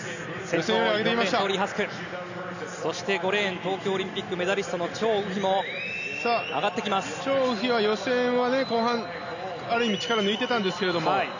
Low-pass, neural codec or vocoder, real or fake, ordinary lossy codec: 7.2 kHz; none; real; none